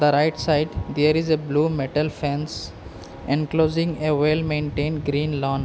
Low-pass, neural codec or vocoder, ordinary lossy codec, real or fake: none; none; none; real